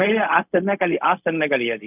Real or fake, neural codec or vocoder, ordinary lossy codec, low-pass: fake; codec, 16 kHz, 0.4 kbps, LongCat-Audio-Codec; none; 3.6 kHz